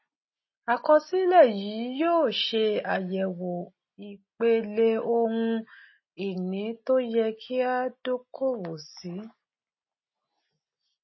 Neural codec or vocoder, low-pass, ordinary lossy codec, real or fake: none; 7.2 kHz; MP3, 24 kbps; real